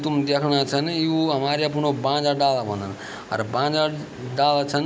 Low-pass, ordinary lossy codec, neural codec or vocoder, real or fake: none; none; none; real